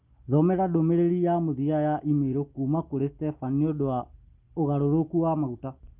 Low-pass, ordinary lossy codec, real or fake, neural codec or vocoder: 3.6 kHz; Opus, 32 kbps; real; none